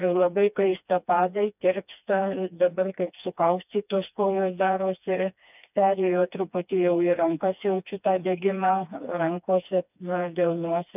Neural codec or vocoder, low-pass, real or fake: codec, 16 kHz, 2 kbps, FreqCodec, smaller model; 3.6 kHz; fake